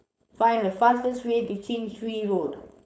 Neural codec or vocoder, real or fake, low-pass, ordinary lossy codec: codec, 16 kHz, 4.8 kbps, FACodec; fake; none; none